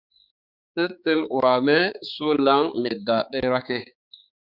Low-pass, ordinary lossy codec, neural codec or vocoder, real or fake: 5.4 kHz; Opus, 64 kbps; codec, 16 kHz, 4 kbps, X-Codec, HuBERT features, trained on balanced general audio; fake